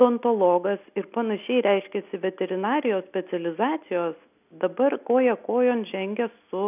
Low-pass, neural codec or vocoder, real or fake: 3.6 kHz; none; real